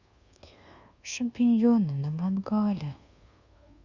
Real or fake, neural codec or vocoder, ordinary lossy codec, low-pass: fake; codec, 24 kHz, 1.2 kbps, DualCodec; Opus, 64 kbps; 7.2 kHz